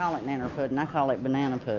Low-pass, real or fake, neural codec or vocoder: 7.2 kHz; real; none